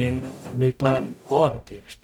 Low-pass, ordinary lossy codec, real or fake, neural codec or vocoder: 19.8 kHz; none; fake; codec, 44.1 kHz, 0.9 kbps, DAC